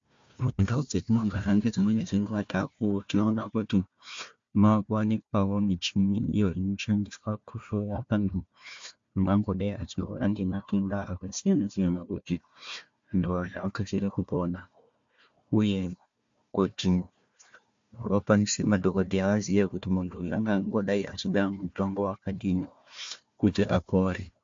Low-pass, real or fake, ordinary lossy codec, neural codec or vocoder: 7.2 kHz; fake; MP3, 48 kbps; codec, 16 kHz, 1 kbps, FunCodec, trained on Chinese and English, 50 frames a second